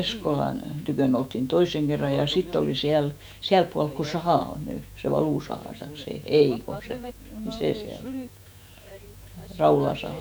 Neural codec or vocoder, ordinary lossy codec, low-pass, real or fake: autoencoder, 48 kHz, 128 numbers a frame, DAC-VAE, trained on Japanese speech; none; none; fake